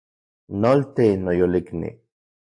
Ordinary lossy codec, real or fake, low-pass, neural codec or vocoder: AAC, 64 kbps; real; 9.9 kHz; none